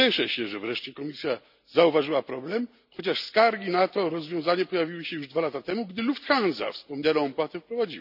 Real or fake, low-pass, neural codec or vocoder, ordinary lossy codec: real; 5.4 kHz; none; none